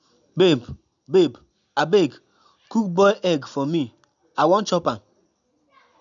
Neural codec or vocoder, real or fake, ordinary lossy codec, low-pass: none; real; none; 7.2 kHz